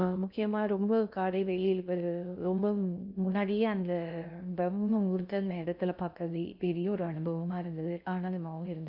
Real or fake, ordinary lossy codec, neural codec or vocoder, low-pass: fake; none; codec, 16 kHz in and 24 kHz out, 0.6 kbps, FocalCodec, streaming, 2048 codes; 5.4 kHz